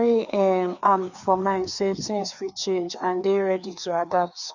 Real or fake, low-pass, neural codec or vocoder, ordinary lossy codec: fake; 7.2 kHz; codec, 16 kHz, 2 kbps, FreqCodec, larger model; none